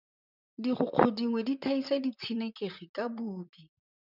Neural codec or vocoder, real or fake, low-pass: vocoder, 44.1 kHz, 128 mel bands, Pupu-Vocoder; fake; 5.4 kHz